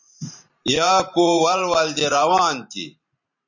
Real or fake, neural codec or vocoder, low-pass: fake; vocoder, 44.1 kHz, 128 mel bands every 512 samples, BigVGAN v2; 7.2 kHz